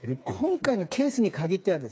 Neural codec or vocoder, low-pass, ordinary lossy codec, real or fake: codec, 16 kHz, 4 kbps, FreqCodec, smaller model; none; none; fake